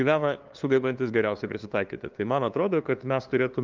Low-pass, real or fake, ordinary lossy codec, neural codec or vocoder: 7.2 kHz; fake; Opus, 32 kbps; codec, 16 kHz, 2 kbps, FunCodec, trained on LibriTTS, 25 frames a second